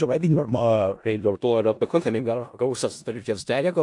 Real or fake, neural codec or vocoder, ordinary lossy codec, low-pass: fake; codec, 16 kHz in and 24 kHz out, 0.4 kbps, LongCat-Audio-Codec, four codebook decoder; AAC, 64 kbps; 10.8 kHz